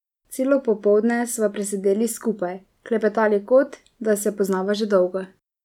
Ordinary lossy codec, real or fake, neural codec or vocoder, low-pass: none; real; none; 19.8 kHz